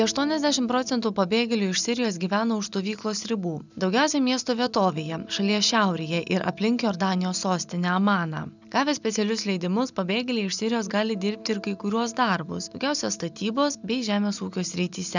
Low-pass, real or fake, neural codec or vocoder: 7.2 kHz; real; none